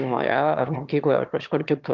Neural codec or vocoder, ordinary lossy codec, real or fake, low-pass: autoencoder, 22.05 kHz, a latent of 192 numbers a frame, VITS, trained on one speaker; Opus, 32 kbps; fake; 7.2 kHz